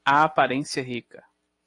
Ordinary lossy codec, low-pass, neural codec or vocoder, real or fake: AAC, 48 kbps; 10.8 kHz; none; real